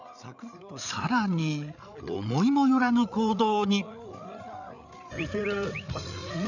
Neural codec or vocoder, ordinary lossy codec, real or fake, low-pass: codec, 16 kHz, 8 kbps, FreqCodec, larger model; none; fake; 7.2 kHz